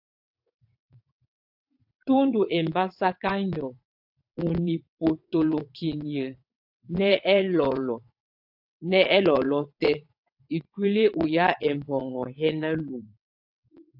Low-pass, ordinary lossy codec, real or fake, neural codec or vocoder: 5.4 kHz; AAC, 48 kbps; fake; vocoder, 22.05 kHz, 80 mel bands, WaveNeXt